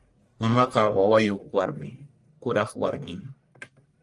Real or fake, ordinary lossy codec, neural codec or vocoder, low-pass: fake; Opus, 32 kbps; codec, 44.1 kHz, 1.7 kbps, Pupu-Codec; 10.8 kHz